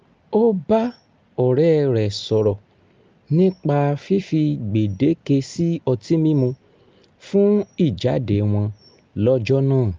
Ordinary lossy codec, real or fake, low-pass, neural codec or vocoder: Opus, 24 kbps; real; 7.2 kHz; none